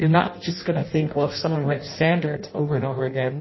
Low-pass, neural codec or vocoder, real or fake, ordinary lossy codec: 7.2 kHz; codec, 16 kHz in and 24 kHz out, 0.6 kbps, FireRedTTS-2 codec; fake; MP3, 24 kbps